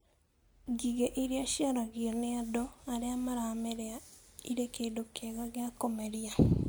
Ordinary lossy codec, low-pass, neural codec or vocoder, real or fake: none; none; none; real